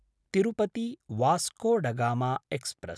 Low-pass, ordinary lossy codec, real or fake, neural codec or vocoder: none; none; real; none